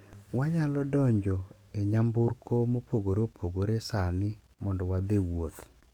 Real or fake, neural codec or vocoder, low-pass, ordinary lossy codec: fake; codec, 44.1 kHz, 7.8 kbps, DAC; 19.8 kHz; MP3, 96 kbps